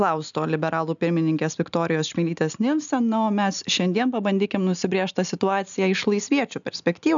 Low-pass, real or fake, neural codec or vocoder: 7.2 kHz; real; none